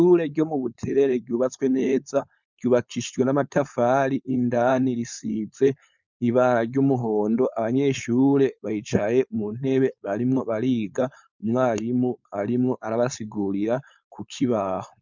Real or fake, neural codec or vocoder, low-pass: fake; codec, 16 kHz, 4.8 kbps, FACodec; 7.2 kHz